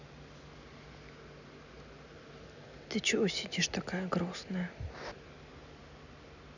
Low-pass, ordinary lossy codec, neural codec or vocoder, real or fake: 7.2 kHz; none; none; real